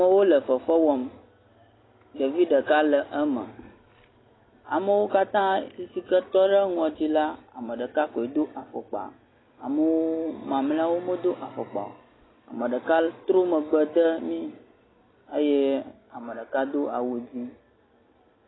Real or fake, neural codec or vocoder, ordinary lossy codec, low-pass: real; none; AAC, 16 kbps; 7.2 kHz